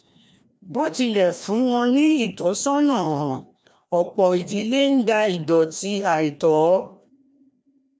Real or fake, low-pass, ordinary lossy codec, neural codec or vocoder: fake; none; none; codec, 16 kHz, 1 kbps, FreqCodec, larger model